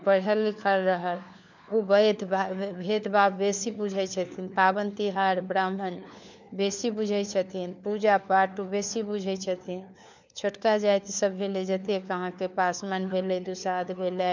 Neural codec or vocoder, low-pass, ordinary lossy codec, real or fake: codec, 16 kHz, 4 kbps, FunCodec, trained on LibriTTS, 50 frames a second; 7.2 kHz; none; fake